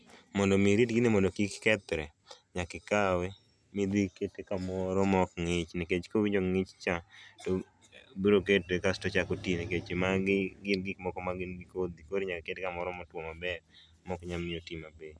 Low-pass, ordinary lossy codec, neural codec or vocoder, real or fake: none; none; none; real